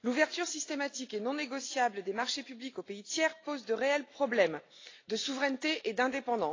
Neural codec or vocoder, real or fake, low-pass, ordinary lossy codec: none; real; 7.2 kHz; AAC, 32 kbps